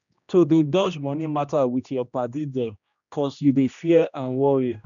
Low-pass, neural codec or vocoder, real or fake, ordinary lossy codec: 7.2 kHz; codec, 16 kHz, 1 kbps, X-Codec, HuBERT features, trained on general audio; fake; none